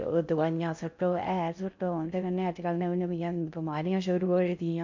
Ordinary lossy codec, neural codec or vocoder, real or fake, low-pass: MP3, 64 kbps; codec, 16 kHz in and 24 kHz out, 0.6 kbps, FocalCodec, streaming, 4096 codes; fake; 7.2 kHz